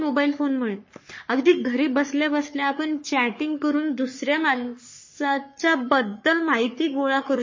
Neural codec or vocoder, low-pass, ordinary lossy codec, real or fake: codec, 44.1 kHz, 3.4 kbps, Pupu-Codec; 7.2 kHz; MP3, 32 kbps; fake